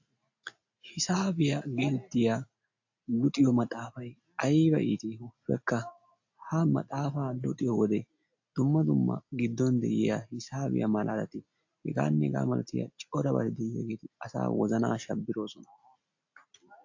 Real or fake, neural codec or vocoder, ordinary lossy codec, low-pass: real; none; AAC, 48 kbps; 7.2 kHz